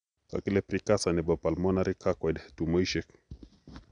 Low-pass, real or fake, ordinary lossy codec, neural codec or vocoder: 9.9 kHz; real; none; none